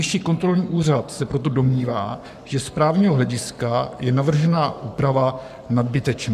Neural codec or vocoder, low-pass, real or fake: codec, 44.1 kHz, 7.8 kbps, Pupu-Codec; 14.4 kHz; fake